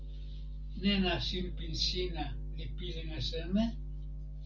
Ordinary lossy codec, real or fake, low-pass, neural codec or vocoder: Opus, 32 kbps; real; 7.2 kHz; none